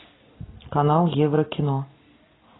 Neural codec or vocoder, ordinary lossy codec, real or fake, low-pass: none; AAC, 16 kbps; real; 7.2 kHz